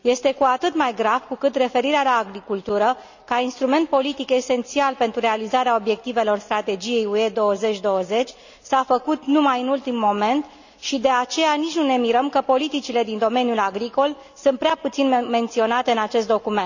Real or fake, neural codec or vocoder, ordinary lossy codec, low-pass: real; none; none; 7.2 kHz